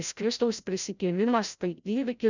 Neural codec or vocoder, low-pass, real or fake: codec, 16 kHz, 0.5 kbps, FreqCodec, larger model; 7.2 kHz; fake